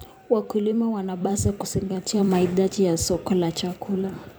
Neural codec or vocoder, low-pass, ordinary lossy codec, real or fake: vocoder, 44.1 kHz, 128 mel bands every 256 samples, BigVGAN v2; none; none; fake